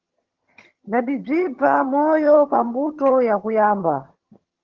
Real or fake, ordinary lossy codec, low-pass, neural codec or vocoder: fake; Opus, 16 kbps; 7.2 kHz; vocoder, 22.05 kHz, 80 mel bands, HiFi-GAN